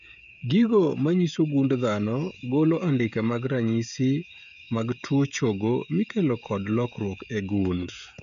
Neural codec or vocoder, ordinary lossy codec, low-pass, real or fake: codec, 16 kHz, 16 kbps, FreqCodec, smaller model; none; 7.2 kHz; fake